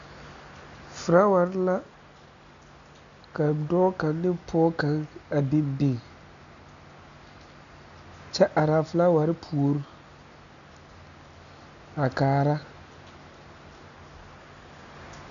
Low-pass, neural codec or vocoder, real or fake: 7.2 kHz; none; real